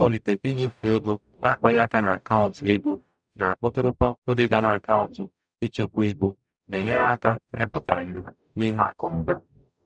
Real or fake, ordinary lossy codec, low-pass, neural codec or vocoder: fake; none; 9.9 kHz; codec, 44.1 kHz, 0.9 kbps, DAC